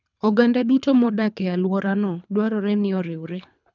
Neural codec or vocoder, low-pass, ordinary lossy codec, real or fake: codec, 24 kHz, 3 kbps, HILCodec; 7.2 kHz; none; fake